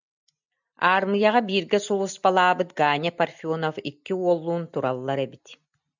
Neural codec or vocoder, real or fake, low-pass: none; real; 7.2 kHz